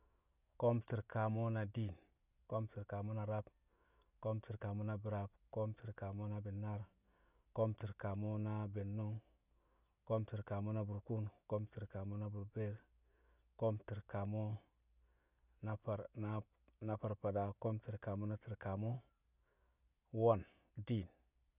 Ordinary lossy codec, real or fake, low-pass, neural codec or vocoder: none; real; 3.6 kHz; none